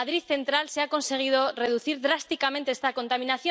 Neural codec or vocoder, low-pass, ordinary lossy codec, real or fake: none; none; none; real